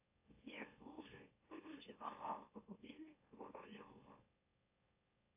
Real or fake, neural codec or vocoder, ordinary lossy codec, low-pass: fake; autoencoder, 44.1 kHz, a latent of 192 numbers a frame, MeloTTS; AAC, 32 kbps; 3.6 kHz